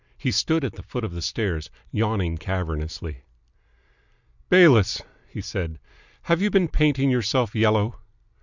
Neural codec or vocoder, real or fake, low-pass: none; real; 7.2 kHz